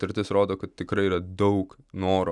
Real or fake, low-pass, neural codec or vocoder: real; 10.8 kHz; none